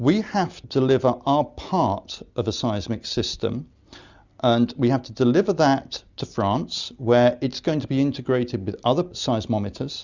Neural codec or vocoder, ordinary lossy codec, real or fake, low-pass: none; Opus, 64 kbps; real; 7.2 kHz